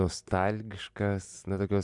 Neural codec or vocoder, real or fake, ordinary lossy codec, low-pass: none; real; MP3, 96 kbps; 10.8 kHz